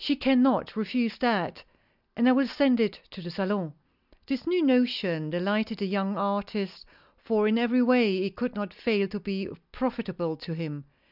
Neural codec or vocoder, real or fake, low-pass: none; real; 5.4 kHz